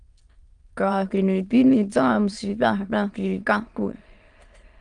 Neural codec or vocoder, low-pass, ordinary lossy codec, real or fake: autoencoder, 22.05 kHz, a latent of 192 numbers a frame, VITS, trained on many speakers; 9.9 kHz; Opus, 32 kbps; fake